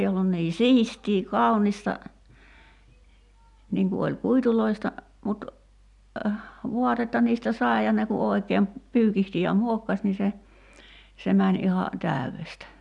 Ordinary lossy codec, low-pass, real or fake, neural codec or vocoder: none; 10.8 kHz; real; none